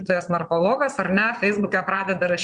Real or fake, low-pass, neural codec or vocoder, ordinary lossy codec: fake; 9.9 kHz; vocoder, 22.05 kHz, 80 mel bands, Vocos; Opus, 64 kbps